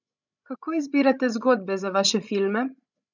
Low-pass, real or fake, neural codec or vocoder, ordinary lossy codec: 7.2 kHz; fake; codec, 16 kHz, 16 kbps, FreqCodec, larger model; none